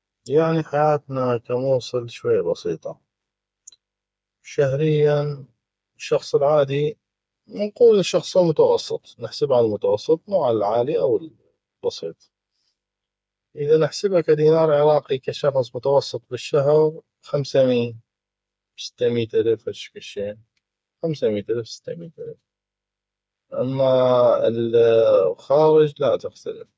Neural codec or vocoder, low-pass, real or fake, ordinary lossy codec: codec, 16 kHz, 4 kbps, FreqCodec, smaller model; none; fake; none